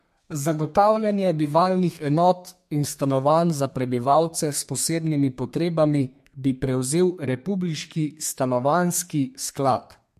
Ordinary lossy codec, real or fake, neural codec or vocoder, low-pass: MP3, 64 kbps; fake; codec, 32 kHz, 1.9 kbps, SNAC; 14.4 kHz